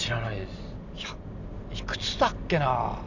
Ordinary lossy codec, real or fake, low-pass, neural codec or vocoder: none; real; 7.2 kHz; none